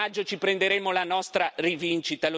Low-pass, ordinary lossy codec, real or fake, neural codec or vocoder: none; none; real; none